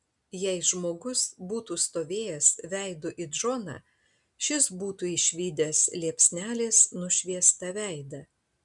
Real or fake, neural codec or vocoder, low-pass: real; none; 10.8 kHz